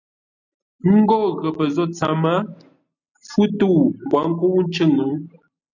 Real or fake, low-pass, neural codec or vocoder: real; 7.2 kHz; none